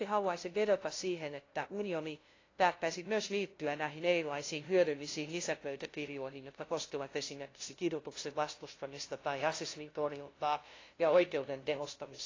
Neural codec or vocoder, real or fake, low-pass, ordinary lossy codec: codec, 16 kHz, 0.5 kbps, FunCodec, trained on LibriTTS, 25 frames a second; fake; 7.2 kHz; AAC, 32 kbps